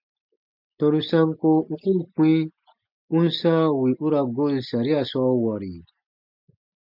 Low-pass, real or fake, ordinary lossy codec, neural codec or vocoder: 5.4 kHz; real; MP3, 48 kbps; none